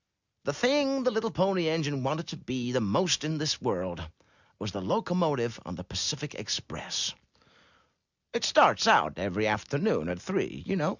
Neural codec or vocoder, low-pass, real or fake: none; 7.2 kHz; real